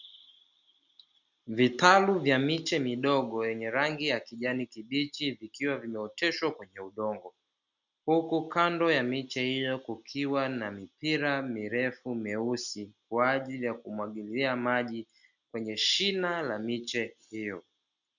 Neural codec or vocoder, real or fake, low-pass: none; real; 7.2 kHz